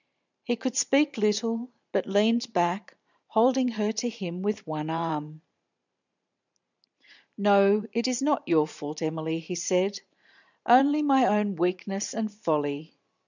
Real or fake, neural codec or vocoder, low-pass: fake; vocoder, 44.1 kHz, 128 mel bands every 512 samples, BigVGAN v2; 7.2 kHz